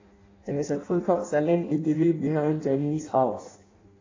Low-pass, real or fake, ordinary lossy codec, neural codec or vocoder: 7.2 kHz; fake; AAC, 32 kbps; codec, 16 kHz in and 24 kHz out, 0.6 kbps, FireRedTTS-2 codec